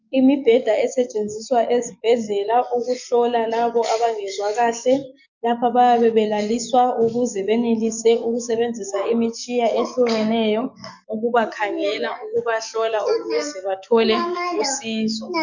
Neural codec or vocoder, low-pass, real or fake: codec, 44.1 kHz, 7.8 kbps, DAC; 7.2 kHz; fake